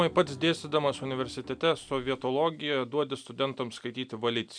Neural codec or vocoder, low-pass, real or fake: none; 9.9 kHz; real